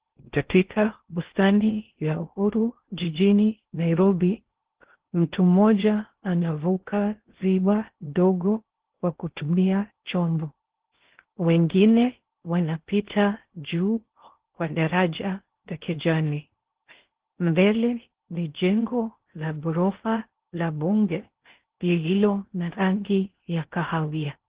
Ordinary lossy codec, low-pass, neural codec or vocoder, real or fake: Opus, 16 kbps; 3.6 kHz; codec, 16 kHz in and 24 kHz out, 0.6 kbps, FocalCodec, streaming, 2048 codes; fake